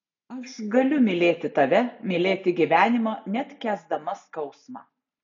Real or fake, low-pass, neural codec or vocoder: real; 7.2 kHz; none